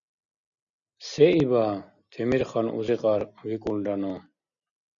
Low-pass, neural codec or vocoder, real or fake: 7.2 kHz; none; real